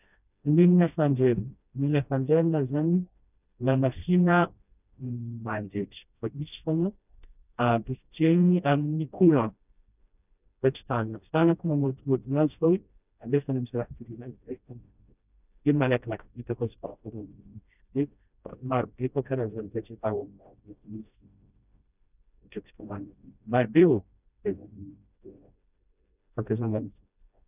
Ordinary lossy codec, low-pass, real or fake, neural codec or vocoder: none; 3.6 kHz; fake; codec, 16 kHz, 1 kbps, FreqCodec, smaller model